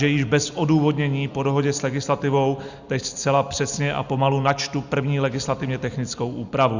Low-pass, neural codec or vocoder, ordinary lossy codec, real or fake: 7.2 kHz; none; Opus, 64 kbps; real